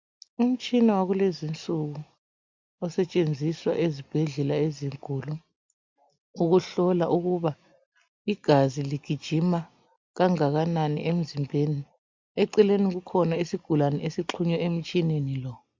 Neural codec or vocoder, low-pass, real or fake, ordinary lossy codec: none; 7.2 kHz; real; MP3, 64 kbps